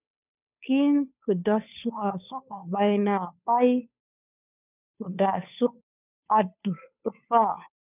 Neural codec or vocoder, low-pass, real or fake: codec, 16 kHz, 2 kbps, FunCodec, trained on Chinese and English, 25 frames a second; 3.6 kHz; fake